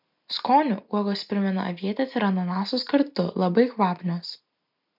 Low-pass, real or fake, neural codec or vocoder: 5.4 kHz; real; none